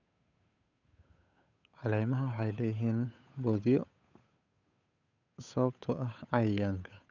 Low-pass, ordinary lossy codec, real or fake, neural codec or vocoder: 7.2 kHz; none; fake; codec, 16 kHz, 8 kbps, FunCodec, trained on Chinese and English, 25 frames a second